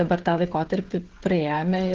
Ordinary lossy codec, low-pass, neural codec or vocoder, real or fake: Opus, 16 kbps; 7.2 kHz; codec, 16 kHz, 16 kbps, FreqCodec, smaller model; fake